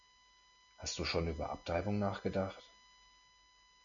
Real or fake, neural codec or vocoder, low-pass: real; none; 7.2 kHz